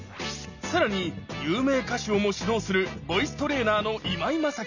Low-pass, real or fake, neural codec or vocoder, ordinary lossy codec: 7.2 kHz; real; none; none